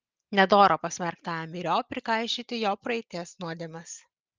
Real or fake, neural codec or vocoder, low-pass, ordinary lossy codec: real; none; 7.2 kHz; Opus, 24 kbps